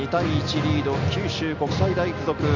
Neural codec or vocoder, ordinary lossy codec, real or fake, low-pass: none; none; real; 7.2 kHz